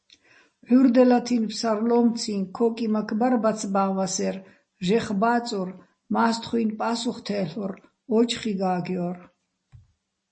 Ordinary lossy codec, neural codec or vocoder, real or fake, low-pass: MP3, 32 kbps; none; real; 9.9 kHz